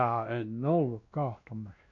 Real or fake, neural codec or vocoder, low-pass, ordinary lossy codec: fake; codec, 16 kHz, 1 kbps, X-Codec, WavLM features, trained on Multilingual LibriSpeech; 7.2 kHz; MP3, 48 kbps